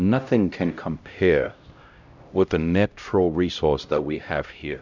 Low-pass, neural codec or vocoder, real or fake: 7.2 kHz; codec, 16 kHz, 0.5 kbps, X-Codec, HuBERT features, trained on LibriSpeech; fake